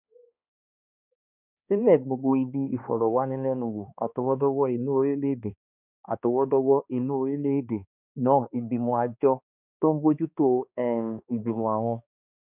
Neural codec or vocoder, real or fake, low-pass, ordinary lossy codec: codec, 16 kHz, 2 kbps, X-Codec, HuBERT features, trained on balanced general audio; fake; 3.6 kHz; none